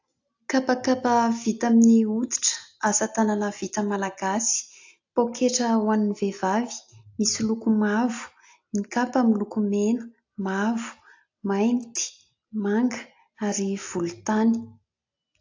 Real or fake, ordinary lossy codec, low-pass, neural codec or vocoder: real; AAC, 48 kbps; 7.2 kHz; none